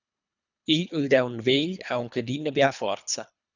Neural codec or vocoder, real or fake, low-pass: codec, 24 kHz, 3 kbps, HILCodec; fake; 7.2 kHz